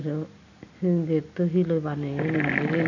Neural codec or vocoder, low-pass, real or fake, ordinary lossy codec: none; 7.2 kHz; real; none